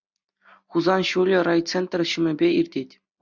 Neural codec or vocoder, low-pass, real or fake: none; 7.2 kHz; real